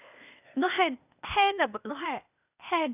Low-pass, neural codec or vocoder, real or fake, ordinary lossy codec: 3.6 kHz; codec, 16 kHz, 0.8 kbps, ZipCodec; fake; none